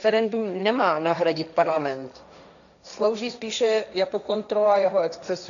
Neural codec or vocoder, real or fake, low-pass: codec, 16 kHz, 1.1 kbps, Voila-Tokenizer; fake; 7.2 kHz